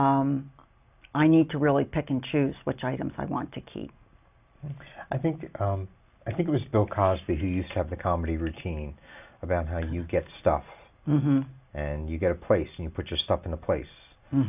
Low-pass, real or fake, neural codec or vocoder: 3.6 kHz; real; none